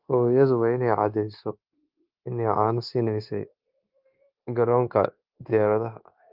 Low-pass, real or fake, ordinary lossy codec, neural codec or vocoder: 5.4 kHz; fake; Opus, 32 kbps; codec, 16 kHz, 0.9 kbps, LongCat-Audio-Codec